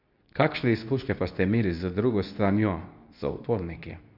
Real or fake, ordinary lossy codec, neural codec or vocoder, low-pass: fake; none; codec, 24 kHz, 0.9 kbps, WavTokenizer, medium speech release version 2; 5.4 kHz